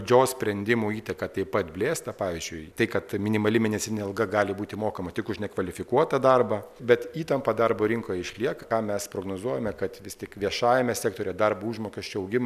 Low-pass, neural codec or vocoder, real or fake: 14.4 kHz; none; real